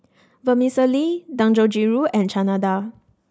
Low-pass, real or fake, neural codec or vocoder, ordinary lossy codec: none; real; none; none